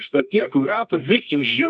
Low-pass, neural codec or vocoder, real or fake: 10.8 kHz; codec, 24 kHz, 0.9 kbps, WavTokenizer, medium music audio release; fake